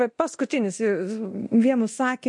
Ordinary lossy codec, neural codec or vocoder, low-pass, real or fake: MP3, 48 kbps; codec, 24 kHz, 0.9 kbps, DualCodec; 10.8 kHz; fake